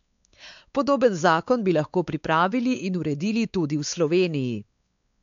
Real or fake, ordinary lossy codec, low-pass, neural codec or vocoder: fake; MP3, 64 kbps; 7.2 kHz; codec, 16 kHz, 4 kbps, X-Codec, WavLM features, trained on Multilingual LibriSpeech